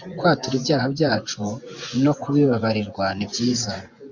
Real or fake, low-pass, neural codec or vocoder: fake; 7.2 kHz; vocoder, 24 kHz, 100 mel bands, Vocos